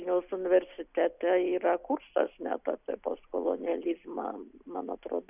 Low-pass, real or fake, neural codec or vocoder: 3.6 kHz; real; none